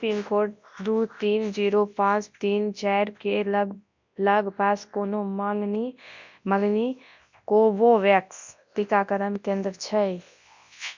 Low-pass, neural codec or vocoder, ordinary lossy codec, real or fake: 7.2 kHz; codec, 24 kHz, 0.9 kbps, WavTokenizer, large speech release; MP3, 64 kbps; fake